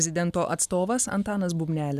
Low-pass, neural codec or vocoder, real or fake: 14.4 kHz; none; real